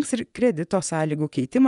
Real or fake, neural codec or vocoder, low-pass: fake; vocoder, 24 kHz, 100 mel bands, Vocos; 10.8 kHz